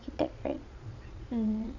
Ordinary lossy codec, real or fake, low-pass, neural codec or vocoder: none; fake; 7.2 kHz; vocoder, 22.05 kHz, 80 mel bands, Vocos